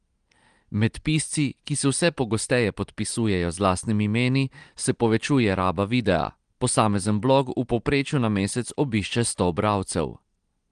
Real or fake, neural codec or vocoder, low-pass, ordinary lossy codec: real; none; 9.9 kHz; Opus, 24 kbps